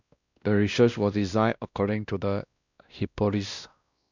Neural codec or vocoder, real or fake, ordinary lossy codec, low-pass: codec, 16 kHz, 1 kbps, X-Codec, WavLM features, trained on Multilingual LibriSpeech; fake; none; 7.2 kHz